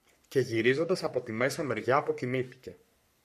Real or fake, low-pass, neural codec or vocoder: fake; 14.4 kHz; codec, 44.1 kHz, 3.4 kbps, Pupu-Codec